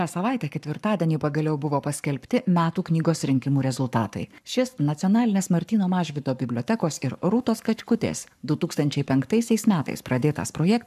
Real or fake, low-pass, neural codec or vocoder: fake; 14.4 kHz; codec, 44.1 kHz, 7.8 kbps, Pupu-Codec